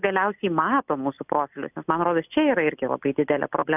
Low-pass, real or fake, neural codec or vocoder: 3.6 kHz; real; none